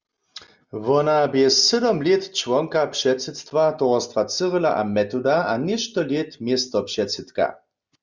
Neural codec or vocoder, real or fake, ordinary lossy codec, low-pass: none; real; Opus, 64 kbps; 7.2 kHz